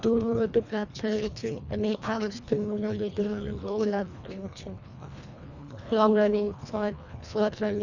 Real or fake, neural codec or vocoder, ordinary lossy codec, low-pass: fake; codec, 24 kHz, 1.5 kbps, HILCodec; none; 7.2 kHz